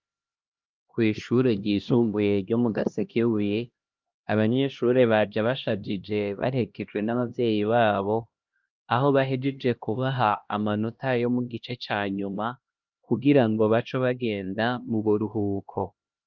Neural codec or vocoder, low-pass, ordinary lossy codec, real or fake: codec, 16 kHz, 1 kbps, X-Codec, HuBERT features, trained on LibriSpeech; 7.2 kHz; Opus, 24 kbps; fake